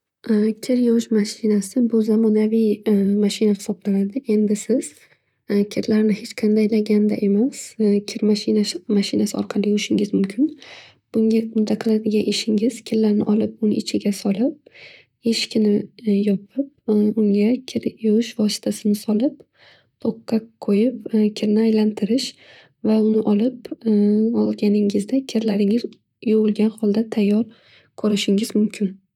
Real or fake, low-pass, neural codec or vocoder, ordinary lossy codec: fake; 19.8 kHz; vocoder, 44.1 kHz, 128 mel bands, Pupu-Vocoder; none